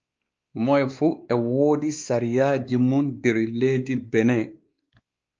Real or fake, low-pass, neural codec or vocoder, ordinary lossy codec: fake; 7.2 kHz; codec, 16 kHz, 2 kbps, X-Codec, WavLM features, trained on Multilingual LibriSpeech; Opus, 24 kbps